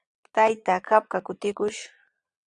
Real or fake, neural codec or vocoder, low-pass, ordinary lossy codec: fake; vocoder, 22.05 kHz, 80 mel bands, Vocos; 9.9 kHz; Opus, 64 kbps